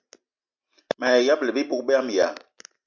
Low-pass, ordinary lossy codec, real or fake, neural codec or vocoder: 7.2 kHz; MP3, 64 kbps; real; none